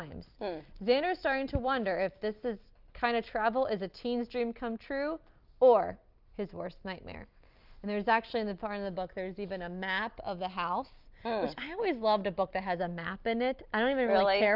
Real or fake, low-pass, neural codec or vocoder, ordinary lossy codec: real; 5.4 kHz; none; Opus, 24 kbps